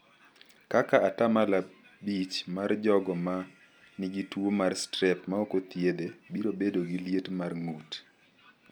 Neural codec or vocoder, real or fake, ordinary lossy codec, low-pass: none; real; none; none